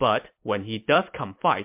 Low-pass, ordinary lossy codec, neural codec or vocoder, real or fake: 3.6 kHz; MP3, 32 kbps; vocoder, 44.1 kHz, 128 mel bands every 256 samples, BigVGAN v2; fake